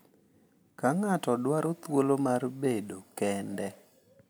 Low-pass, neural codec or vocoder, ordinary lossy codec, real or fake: none; none; none; real